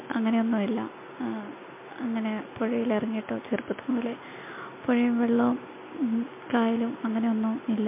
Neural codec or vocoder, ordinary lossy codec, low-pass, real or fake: none; MP3, 24 kbps; 3.6 kHz; real